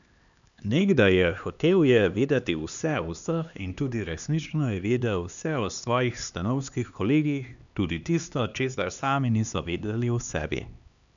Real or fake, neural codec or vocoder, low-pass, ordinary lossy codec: fake; codec, 16 kHz, 2 kbps, X-Codec, HuBERT features, trained on LibriSpeech; 7.2 kHz; none